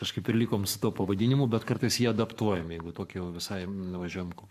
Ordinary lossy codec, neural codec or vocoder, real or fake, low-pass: MP3, 96 kbps; codec, 44.1 kHz, 7.8 kbps, Pupu-Codec; fake; 14.4 kHz